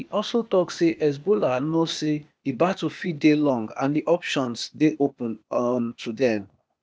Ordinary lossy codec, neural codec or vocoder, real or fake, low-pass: none; codec, 16 kHz, 0.8 kbps, ZipCodec; fake; none